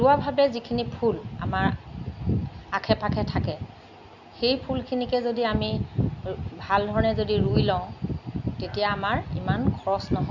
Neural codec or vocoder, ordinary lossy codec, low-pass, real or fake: none; none; 7.2 kHz; real